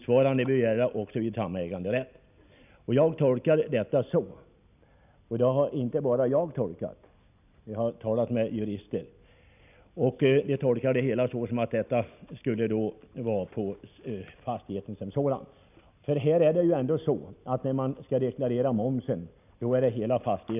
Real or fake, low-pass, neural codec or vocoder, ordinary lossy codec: real; 3.6 kHz; none; none